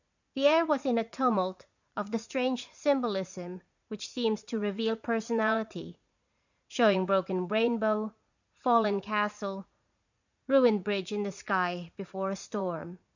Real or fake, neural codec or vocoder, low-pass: fake; vocoder, 44.1 kHz, 80 mel bands, Vocos; 7.2 kHz